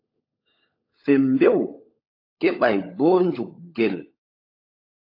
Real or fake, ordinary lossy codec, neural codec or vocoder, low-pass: fake; AAC, 32 kbps; codec, 16 kHz, 16 kbps, FunCodec, trained on LibriTTS, 50 frames a second; 5.4 kHz